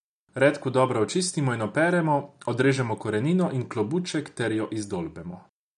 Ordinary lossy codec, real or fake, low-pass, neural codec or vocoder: none; real; 10.8 kHz; none